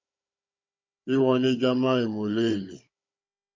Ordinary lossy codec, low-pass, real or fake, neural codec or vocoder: MP3, 48 kbps; 7.2 kHz; fake; codec, 16 kHz, 16 kbps, FunCodec, trained on Chinese and English, 50 frames a second